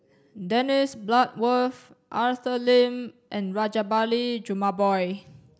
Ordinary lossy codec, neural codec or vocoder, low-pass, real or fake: none; none; none; real